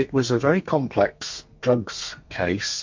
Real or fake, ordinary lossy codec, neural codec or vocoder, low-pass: fake; MP3, 48 kbps; codec, 32 kHz, 1.9 kbps, SNAC; 7.2 kHz